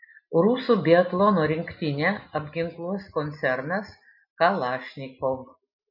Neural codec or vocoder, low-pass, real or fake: none; 5.4 kHz; real